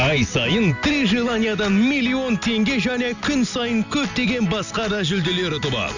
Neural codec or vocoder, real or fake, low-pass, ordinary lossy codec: none; real; 7.2 kHz; none